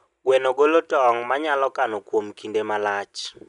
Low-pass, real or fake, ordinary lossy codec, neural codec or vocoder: 10.8 kHz; real; none; none